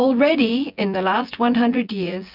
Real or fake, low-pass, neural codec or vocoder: fake; 5.4 kHz; vocoder, 24 kHz, 100 mel bands, Vocos